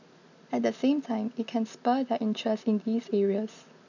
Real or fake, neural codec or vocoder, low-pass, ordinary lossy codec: real; none; 7.2 kHz; none